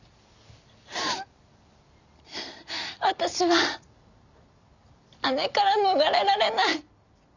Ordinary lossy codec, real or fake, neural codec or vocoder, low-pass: none; real; none; 7.2 kHz